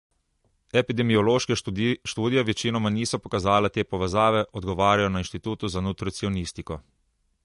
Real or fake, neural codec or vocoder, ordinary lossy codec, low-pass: real; none; MP3, 48 kbps; 14.4 kHz